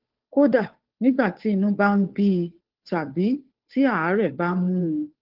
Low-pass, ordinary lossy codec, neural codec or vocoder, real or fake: 5.4 kHz; Opus, 16 kbps; codec, 16 kHz, 2 kbps, FunCodec, trained on Chinese and English, 25 frames a second; fake